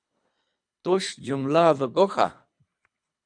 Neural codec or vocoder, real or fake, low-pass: codec, 24 kHz, 3 kbps, HILCodec; fake; 9.9 kHz